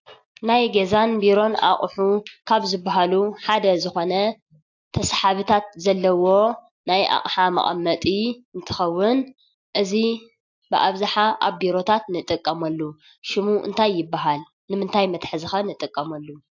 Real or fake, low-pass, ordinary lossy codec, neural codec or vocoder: real; 7.2 kHz; AAC, 48 kbps; none